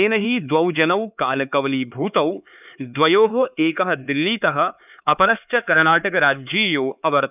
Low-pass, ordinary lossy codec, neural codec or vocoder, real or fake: 3.6 kHz; none; codec, 16 kHz, 4 kbps, X-Codec, HuBERT features, trained on LibriSpeech; fake